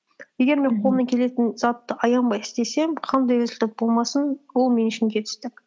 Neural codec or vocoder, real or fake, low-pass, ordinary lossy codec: none; real; none; none